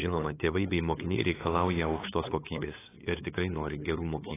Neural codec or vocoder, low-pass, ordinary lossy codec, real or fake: codec, 16 kHz, 8 kbps, FunCodec, trained on LibriTTS, 25 frames a second; 3.6 kHz; AAC, 16 kbps; fake